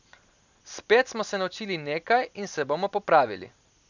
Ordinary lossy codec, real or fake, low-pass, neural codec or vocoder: none; real; 7.2 kHz; none